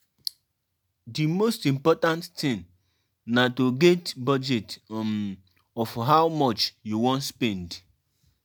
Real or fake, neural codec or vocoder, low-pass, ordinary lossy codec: real; none; none; none